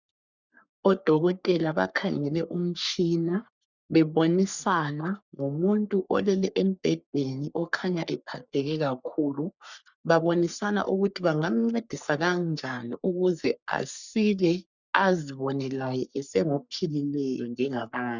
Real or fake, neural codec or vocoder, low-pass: fake; codec, 44.1 kHz, 3.4 kbps, Pupu-Codec; 7.2 kHz